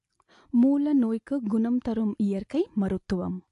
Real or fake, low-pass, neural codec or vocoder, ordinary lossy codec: real; 10.8 kHz; none; AAC, 48 kbps